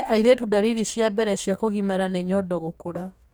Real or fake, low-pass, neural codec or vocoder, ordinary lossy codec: fake; none; codec, 44.1 kHz, 2.6 kbps, DAC; none